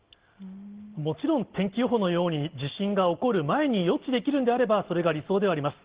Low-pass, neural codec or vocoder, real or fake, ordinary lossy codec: 3.6 kHz; none; real; Opus, 16 kbps